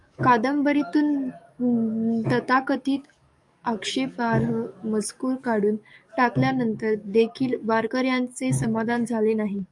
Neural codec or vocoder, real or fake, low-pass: codec, 44.1 kHz, 7.8 kbps, DAC; fake; 10.8 kHz